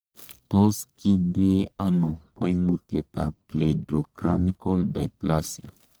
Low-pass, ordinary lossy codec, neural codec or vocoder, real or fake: none; none; codec, 44.1 kHz, 1.7 kbps, Pupu-Codec; fake